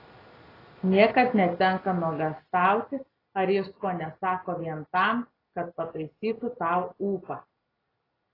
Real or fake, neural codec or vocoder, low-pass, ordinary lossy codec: real; none; 5.4 kHz; AAC, 24 kbps